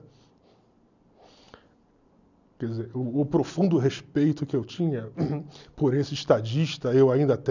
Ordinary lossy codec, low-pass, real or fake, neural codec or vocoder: none; 7.2 kHz; real; none